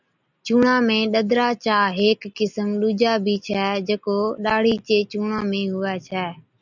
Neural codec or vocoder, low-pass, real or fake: none; 7.2 kHz; real